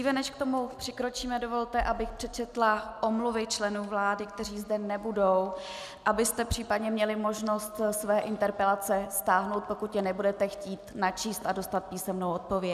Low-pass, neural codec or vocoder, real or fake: 14.4 kHz; none; real